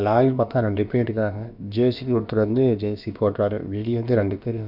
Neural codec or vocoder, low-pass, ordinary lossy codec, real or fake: codec, 16 kHz, about 1 kbps, DyCAST, with the encoder's durations; 5.4 kHz; none; fake